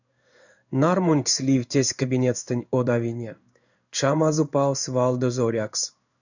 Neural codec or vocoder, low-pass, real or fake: codec, 16 kHz in and 24 kHz out, 1 kbps, XY-Tokenizer; 7.2 kHz; fake